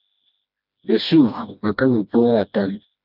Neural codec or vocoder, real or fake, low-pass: codec, 16 kHz, 1 kbps, FreqCodec, smaller model; fake; 5.4 kHz